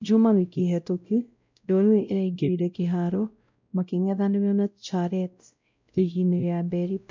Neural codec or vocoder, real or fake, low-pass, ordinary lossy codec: codec, 16 kHz, 0.5 kbps, X-Codec, WavLM features, trained on Multilingual LibriSpeech; fake; 7.2 kHz; MP3, 64 kbps